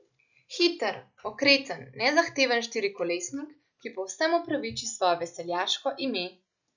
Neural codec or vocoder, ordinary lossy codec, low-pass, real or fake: none; none; 7.2 kHz; real